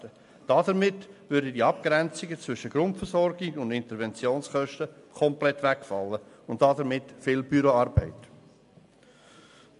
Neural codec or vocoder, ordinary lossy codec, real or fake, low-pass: none; MP3, 64 kbps; real; 10.8 kHz